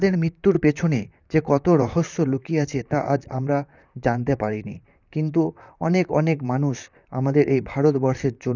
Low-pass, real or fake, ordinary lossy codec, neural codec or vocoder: 7.2 kHz; fake; none; vocoder, 44.1 kHz, 128 mel bands, Pupu-Vocoder